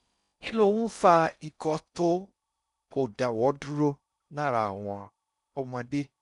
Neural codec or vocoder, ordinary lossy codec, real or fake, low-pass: codec, 16 kHz in and 24 kHz out, 0.6 kbps, FocalCodec, streaming, 4096 codes; none; fake; 10.8 kHz